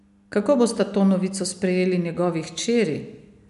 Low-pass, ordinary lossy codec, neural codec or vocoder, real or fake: 10.8 kHz; none; none; real